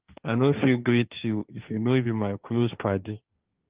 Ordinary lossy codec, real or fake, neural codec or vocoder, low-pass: Opus, 32 kbps; fake; codec, 16 kHz, 1.1 kbps, Voila-Tokenizer; 3.6 kHz